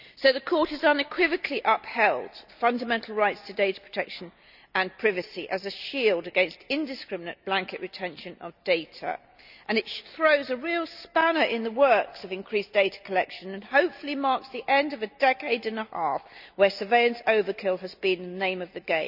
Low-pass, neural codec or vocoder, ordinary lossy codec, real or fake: 5.4 kHz; none; none; real